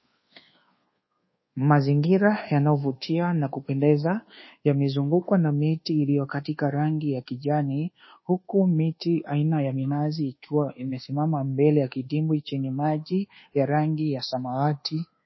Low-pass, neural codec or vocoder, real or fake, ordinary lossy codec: 7.2 kHz; codec, 24 kHz, 1.2 kbps, DualCodec; fake; MP3, 24 kbps